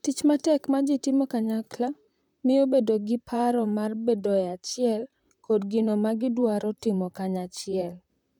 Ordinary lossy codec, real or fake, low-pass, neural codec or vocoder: none; fake; 19.8 kHz; vocoder, 44.1 kHz, 128 mel bands, Pupu-Vocoder